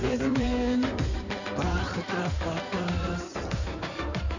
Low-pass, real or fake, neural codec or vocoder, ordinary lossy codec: 7.2 kHz; fake; codec, 16 kHz in and 24 kHz out, 2.2 kbps, FireRedTTS-2 codec; none